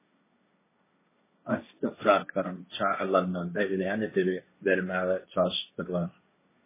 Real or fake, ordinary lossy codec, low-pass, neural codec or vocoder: fake; MP3, 16 kbps; 3.6 kHz; codec, 16 kHz, 1.1 kbps, Voila-Tokenizer